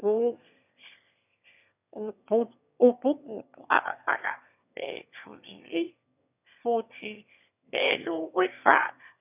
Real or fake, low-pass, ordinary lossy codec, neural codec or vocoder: fake; 3.6 kHz; none; autoencoder, 22.05 kHz, a latent of 192 numbers a frame, VITS, trained on one speaker